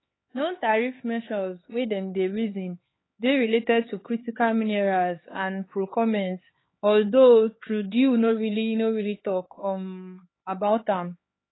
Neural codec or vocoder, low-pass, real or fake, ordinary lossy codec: codec, 16 kHz, 4 kbps, X-Codec, HuBERT features, trained on LibriSpeech; 7.2 kHz; fake; AAC, 16 kbps